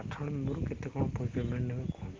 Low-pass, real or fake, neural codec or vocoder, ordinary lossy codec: 7.2 kHz; real; none; Opus, 16 kbps